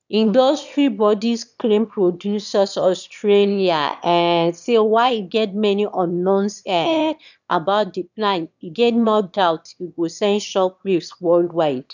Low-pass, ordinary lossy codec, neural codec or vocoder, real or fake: 7.2 kHz; none; autoencoder, 22.05 kHz, a latent of 192 numbers a frame, VITS, trained on one speaker; fake